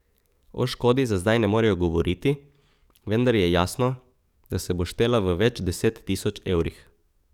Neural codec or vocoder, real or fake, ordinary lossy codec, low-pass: codec, 44.1 kHz, 7.8 kbps, DAC; fake; none; 19.8 kHz